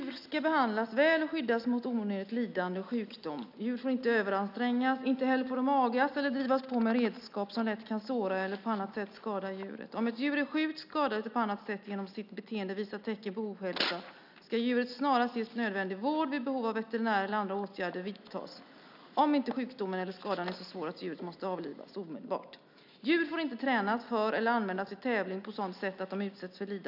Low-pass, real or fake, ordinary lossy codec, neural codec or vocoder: 5.4 kHz; real; none; none